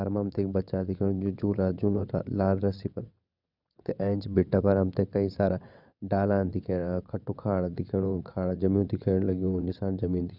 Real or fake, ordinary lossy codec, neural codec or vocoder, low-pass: fake; none; vocoder, 22.05 kHz, 80 mel bands, WaveNeXt; 5.4 kHz